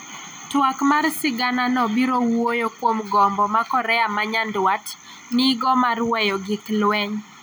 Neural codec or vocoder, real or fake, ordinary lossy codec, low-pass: none; real; none; none